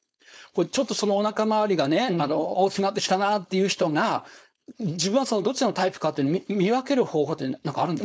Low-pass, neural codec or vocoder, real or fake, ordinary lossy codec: none; codec, 16 kHz, 4.8 kbps, FACodec; fake; none